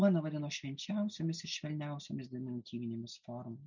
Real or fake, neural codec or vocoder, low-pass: real; none; 7.2 kHz